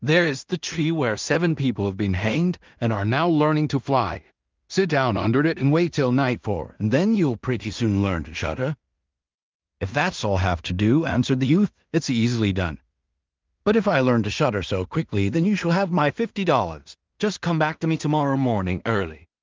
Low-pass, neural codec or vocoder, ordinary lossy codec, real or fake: 7.2 kHz; codec, 16 kHz in and 24 kHz out, 0.4 kbps, LongCat-Audio-Codec, two codebook decoder; Opus, 24 kbps; fake